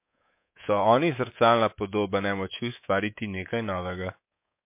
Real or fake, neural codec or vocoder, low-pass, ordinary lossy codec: fake; codec, 24 kHz, 3.1 kbps, DualCodec; 3.6 kHz; MP3, 24 kbps